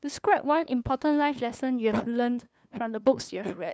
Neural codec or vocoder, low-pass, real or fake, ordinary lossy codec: codec, 16 kHz, 2 kbps, FunCodec, trained on LibriTTS, 25 frames a second; none; fake; none